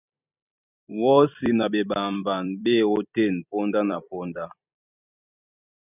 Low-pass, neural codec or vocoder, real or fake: 3.6 kHz; vocoder, 44.1 kHz, 128 mel bands every 256 samples, BigVGAN v2; fake